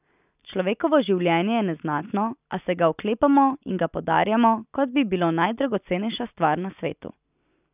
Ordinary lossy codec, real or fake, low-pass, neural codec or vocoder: none; real; 3.6 kHz; none